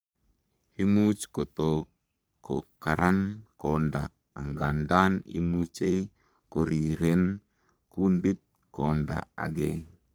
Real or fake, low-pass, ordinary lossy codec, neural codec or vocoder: fake; none; none; codec, 44.1 kHz, 3.4 kbps, Pupu-Codec